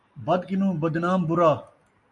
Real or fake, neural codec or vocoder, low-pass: real; none; 10.8 kHz